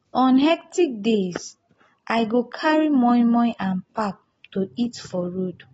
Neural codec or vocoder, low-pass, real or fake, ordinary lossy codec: none; 19.8 kHz; real; AAC, 24 kbps